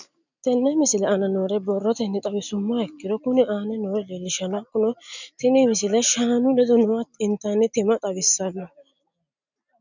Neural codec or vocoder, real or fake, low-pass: none; real; 7.2 kHz